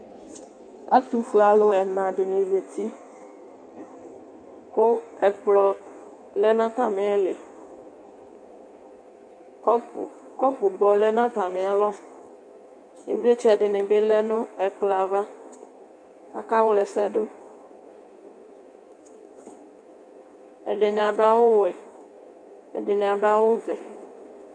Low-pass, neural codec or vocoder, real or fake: 9.9 kHz; codec, 16 kHz in and 24 kHz out, 1.1 kbps, FireRedTTS-2 codec; fake